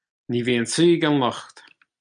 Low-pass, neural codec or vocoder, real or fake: 9.9 kHz; none; real